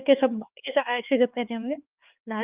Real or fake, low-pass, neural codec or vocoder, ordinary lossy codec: fake; 3.6 kHz; codec, 16 kHz, 4 kbps, X-Codec, HuBERT features, trained on LibriSpeech; Opus, 24 kbps